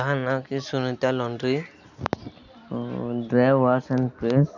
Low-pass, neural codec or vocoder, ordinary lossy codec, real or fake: 7.2 kHz; none; none; real